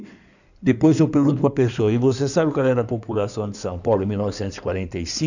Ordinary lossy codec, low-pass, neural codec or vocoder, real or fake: none; 7.2 kHz; codec, 16 kHz in and 24 kHz out, 2.2 kbps, FireRedTTS-2 codec; fake